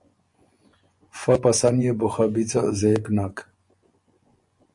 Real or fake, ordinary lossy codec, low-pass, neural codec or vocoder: fake; MP3, 48 kbps; 10.8 kHz; vocoder, 24 kHz, 100 mel bands, Vocos